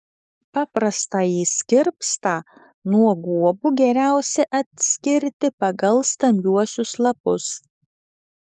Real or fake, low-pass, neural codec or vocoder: fake; 10.8 kHz; codec, 44.1 kHz, 7.8 kbps, DAC